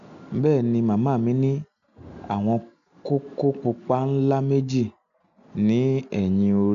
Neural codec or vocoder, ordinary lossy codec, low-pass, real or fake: none; MP3, 96 kbps; 7.2 kHz; real